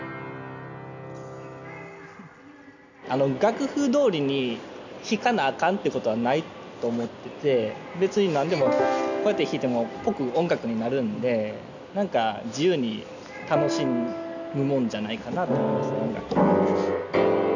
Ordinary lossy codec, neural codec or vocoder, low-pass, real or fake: none; none; 7.2 kHz; real